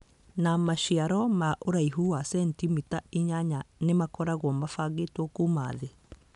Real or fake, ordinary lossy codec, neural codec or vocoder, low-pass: real; none; none; 10.8 kHz